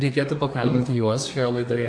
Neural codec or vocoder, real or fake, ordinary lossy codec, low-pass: codec, 24 kHz, 1 kbps, SNAC; fake; AAC, 64 kbps; 9.9 kHz